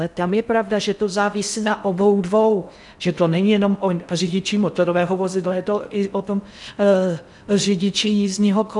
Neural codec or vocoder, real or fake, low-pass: codec, 16 kHz in and 24 kHz out, 0.6 kbps, FocalCodec, streaming, 4096 codes; fake; 10.8 kHz